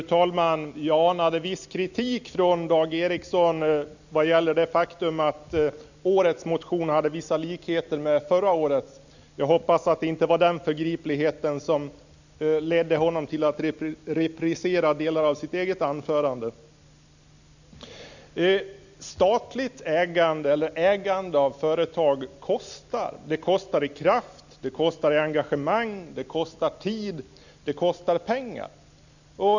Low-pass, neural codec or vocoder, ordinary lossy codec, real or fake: 7.2 kHz; none; none; real